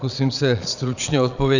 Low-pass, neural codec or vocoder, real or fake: 7.2 kHz; vocoder, 22.05 kHz, 80 mel bands, Vocos; fake